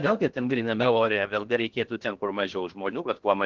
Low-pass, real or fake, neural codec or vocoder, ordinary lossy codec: 7.2 kHz; fake; codec, 16 kHz in and 24 kHz out, 0.6 kbps, FocalCodec, streaming, 2048 codes; Opus, 16 kbps